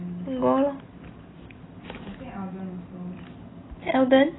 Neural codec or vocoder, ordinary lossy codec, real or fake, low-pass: none; AAC, 16 kbps; real; 7.2 kHz